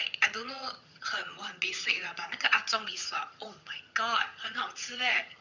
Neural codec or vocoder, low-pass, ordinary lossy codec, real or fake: vocoder, 22.05 kHz, 80 mel bands, HiFi-GAN; 7.2 kHz; none; fake